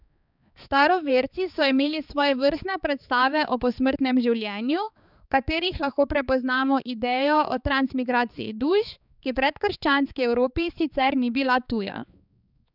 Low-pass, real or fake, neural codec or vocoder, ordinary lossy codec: 5.4 kHz; fake; codec, 16 kHz, 4 kbps, X-Codec, HuBERT features, trained on balanced general audio; none